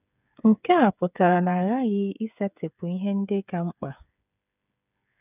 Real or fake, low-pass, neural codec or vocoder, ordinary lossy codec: fake; 3.6 kHz; codec, 16 kHz, 8 kbps, FreqCodec, smaller model; none